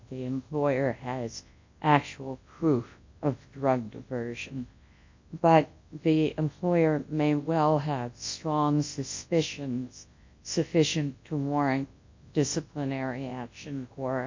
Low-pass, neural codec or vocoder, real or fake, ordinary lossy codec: 7.2 kHz; codec, 24 kHz, 0.9 kbps, WavTokenizer, large speech release; fake; MP3, 48 kbps